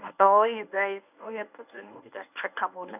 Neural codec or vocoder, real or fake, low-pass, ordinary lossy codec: codec, 24 kHz, 0.9 kbps, WavTokenizer, medium speech release version 1; fake; 3.6 kHz; none